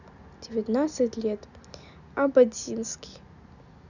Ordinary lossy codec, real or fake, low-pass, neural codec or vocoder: none; real; 7.2 kHz; none